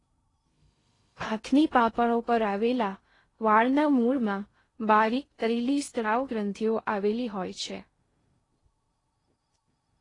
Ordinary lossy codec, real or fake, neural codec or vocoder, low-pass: AAC, 32 kbps; fake; codec, 16 kHz in and 24 kHz out, 0.6 kbps, FocalCodec, streaming, 2048 codes; 10.8 kHz